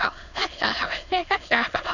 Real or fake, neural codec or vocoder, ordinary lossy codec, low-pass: fake; autoencoder, 22.05 kHz, a latent of 192 numbers a frame, VITS, trained on many speakers; none; 7.2 kHz